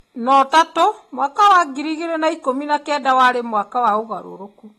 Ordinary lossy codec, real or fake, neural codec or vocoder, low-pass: AAC, 32 kbps; real; none; 19.8 kHz